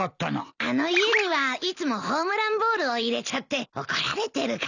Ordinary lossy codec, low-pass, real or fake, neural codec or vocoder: none; 7.2 kHz; real; none